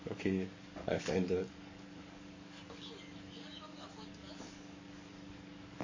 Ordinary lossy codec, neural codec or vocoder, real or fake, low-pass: MP3, 32 kbps; codec, 44.1 kHz, 7.8 kbps, DAC; fake; 7.2 kHz